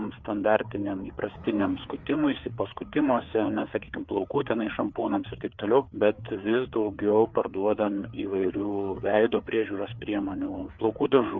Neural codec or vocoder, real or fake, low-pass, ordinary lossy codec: codec, 16 kHz, 4 kbps, FreqCodec, larger model; fake; 7.2 kHz; Opus, 64 kbps